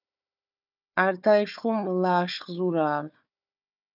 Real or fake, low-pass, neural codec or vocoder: fake; 5.4 kHz; codec, 16 kHz, 4 kbps, FunCodec, trained on Chinese and English, 50 frames a second